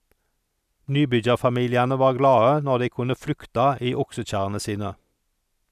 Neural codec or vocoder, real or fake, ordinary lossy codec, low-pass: none; real; none; 14.4 kHz